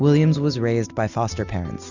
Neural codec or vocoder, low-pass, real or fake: none; 7.2 kHz; real